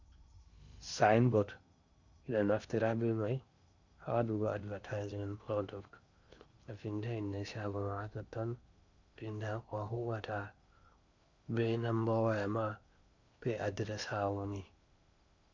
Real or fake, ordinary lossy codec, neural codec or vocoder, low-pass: fake; Opus, 64 kbps; codec, 16 kHz in and 24 kHz out, 0.8 kbps, FocalCodec, streaming, 65536 codes; 7.2 kHz